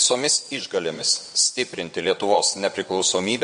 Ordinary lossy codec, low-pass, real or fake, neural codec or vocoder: MP3, 48 kbps; 9.9 kHz; fake; vocoder, 22.05 kHz, 80 mel bands, WaveNeXt